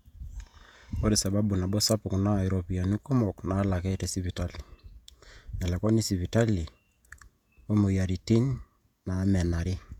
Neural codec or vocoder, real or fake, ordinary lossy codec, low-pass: vocoder, 48 kHz, 128 mel bands, Vocos; fake; none; 19.8 kHz